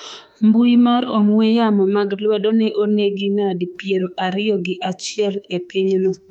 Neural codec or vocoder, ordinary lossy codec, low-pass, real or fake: autoencoder, 48 kHz, 32 numbers a frame, DAC-VAE, trained on Japanese speech; none; 19.8 kHz; fake